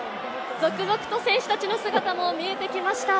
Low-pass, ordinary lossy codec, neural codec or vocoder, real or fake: none; none; none; real